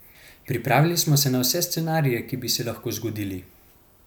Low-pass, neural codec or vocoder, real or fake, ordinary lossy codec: none; none; real; none